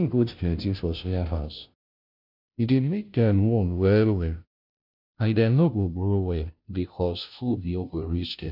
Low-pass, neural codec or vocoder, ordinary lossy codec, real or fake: 5.4 kHz; codec, 16 kHz, 0.5 kbps, FunCodec, trained on Chinese and English, 25 frames a second; none; fake